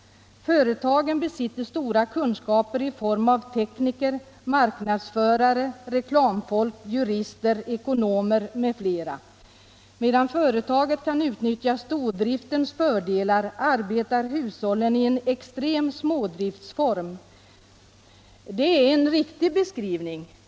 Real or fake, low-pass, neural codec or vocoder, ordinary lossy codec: real; none; none; none